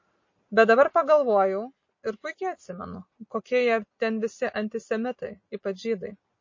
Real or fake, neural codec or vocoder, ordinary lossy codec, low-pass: real; none; MP3, 32 kbps; 7.2 kHz